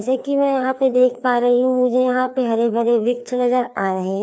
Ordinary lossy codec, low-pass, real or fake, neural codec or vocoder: none; none; fake; codec, 16 kHz, 2 kbps, FreqCodec, larger model